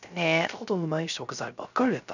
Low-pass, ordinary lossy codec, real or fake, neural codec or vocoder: 7.2 kHz; none; fake; codec, 16 kHz, 0.3 kbps, FocalCodec